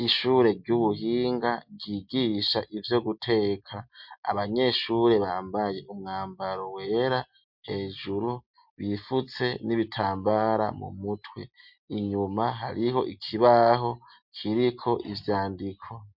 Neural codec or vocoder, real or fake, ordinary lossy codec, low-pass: none; real; MP3, 48 kbps; 5.4 kHz